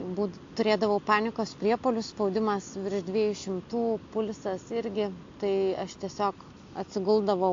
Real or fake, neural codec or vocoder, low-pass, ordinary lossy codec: real; none; 7.2 kHz; AAC, 48 kbps